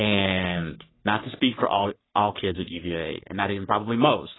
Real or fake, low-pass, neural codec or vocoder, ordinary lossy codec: fake; 7.2 kHz; codec, 44.1 kHz, 3.4 kbps, Pupu-Codec; AAC, 16 kbps